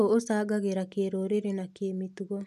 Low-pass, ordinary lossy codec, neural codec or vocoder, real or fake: 14.4 kHz; none; none; real